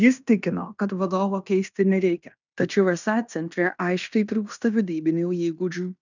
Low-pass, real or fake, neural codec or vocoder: 7.2 kHz; fake; codec, 16 kHz in and 24 kHz out, 0.9 kbps, LongCat-Audio-Codec, fine tuned four codebook decoder